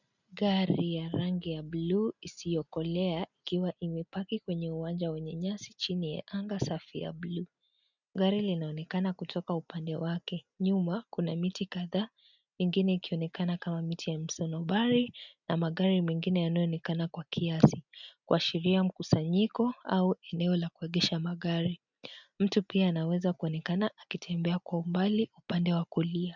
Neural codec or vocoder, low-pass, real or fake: none; 7.2 kHz; real